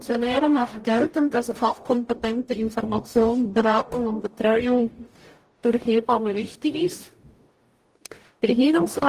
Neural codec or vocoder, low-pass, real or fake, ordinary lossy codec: codec, 44.1 kHz, 0.9 kbps, DAC; 14.4 kHz; fake; Opus, 24 kbps